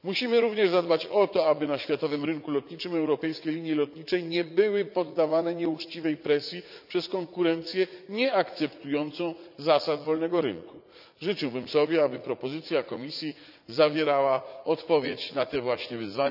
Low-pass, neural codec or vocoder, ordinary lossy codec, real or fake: 5.4 kHz; vocoder, 44.1 kHz, 80 mel bands, Vocos; none; fake